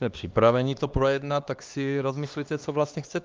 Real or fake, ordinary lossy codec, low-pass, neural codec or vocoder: fake; Opus, 24 kbps; 7.2 kHz; codec, 16 kHz, 2 kbps, X-Codec, HuBERT features, trained on LibriSpeech